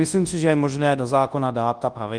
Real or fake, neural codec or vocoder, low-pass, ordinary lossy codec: fake; codec, 24 kHz, 0.9 kbps, WavTokenizer, large speech release; 9.9 kHz; Opus, 24 kbps